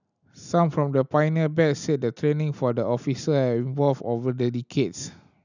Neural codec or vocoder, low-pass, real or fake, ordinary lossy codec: none; 7.2 kHz; real; none